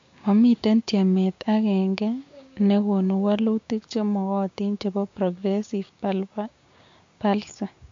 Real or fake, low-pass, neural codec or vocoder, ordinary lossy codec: fake; 7.2 kHz; codec, 16 kHz, 6 kbps, DAC; MP3, 48 kbps